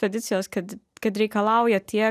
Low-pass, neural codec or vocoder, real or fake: 14.4 kHz; none; real